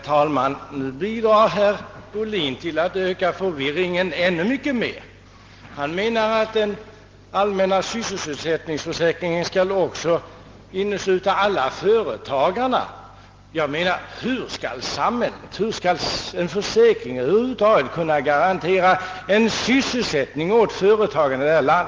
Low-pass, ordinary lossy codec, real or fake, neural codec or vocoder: 7.2 kHz; Opus, 16 kbps; real; none